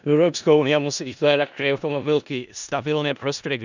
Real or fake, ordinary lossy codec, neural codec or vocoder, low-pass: fake; none; codec, 16 kHz in and 24 kHz out, 0.4 kbps, LongCat-Audio-Codec, four codebook decoder; 7.2 kHz